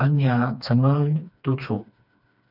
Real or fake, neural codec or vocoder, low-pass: fake; codec, 16 kHz, 2 kbps, FreqCodec, smaller model; 5.4 kHz